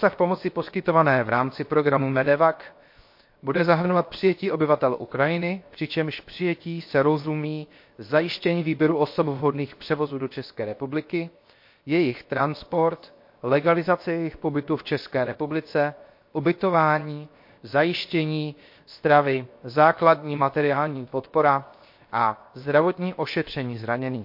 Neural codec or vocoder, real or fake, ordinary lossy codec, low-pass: codec, 16 kHz, 0.7 kbps, FocalCodec; fake; MP3, 32 kbps; 5.4 kHz